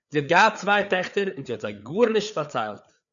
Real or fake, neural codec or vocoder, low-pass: fake; codec, 16 kHz, 4 kbps, FreqCodec, larger model; 7.2 kHz